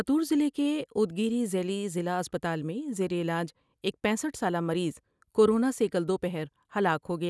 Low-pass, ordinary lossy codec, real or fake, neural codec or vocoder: none; none; real; none